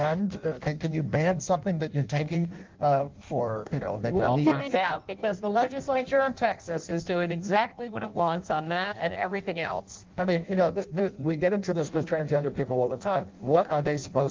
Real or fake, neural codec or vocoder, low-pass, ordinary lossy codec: fake; codec, 16 kHz in and 24 kHz out, 0.6 kbps, FireRedTTS-2 codec; 7.2 kHz; Opus, 24 kbps